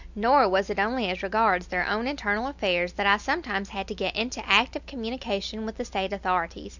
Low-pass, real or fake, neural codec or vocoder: 7.2 kHz; real; none